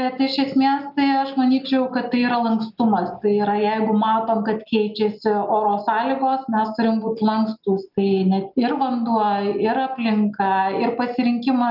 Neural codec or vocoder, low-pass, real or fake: none; 5.4 kHz; real